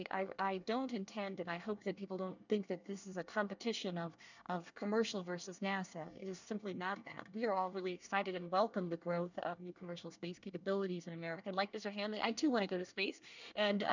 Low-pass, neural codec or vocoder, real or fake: 7.2 kHz; codec, 24 kHz, 1 kbps, SNAC; fake